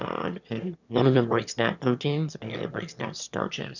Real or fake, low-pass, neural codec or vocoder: fake; 7.2 kHz; autoencoder, 22.05 kHz, a latent of 192 numbers a frame, VITS, trained on one speaker